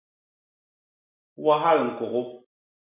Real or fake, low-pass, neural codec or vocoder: real; 3.6 kHz; none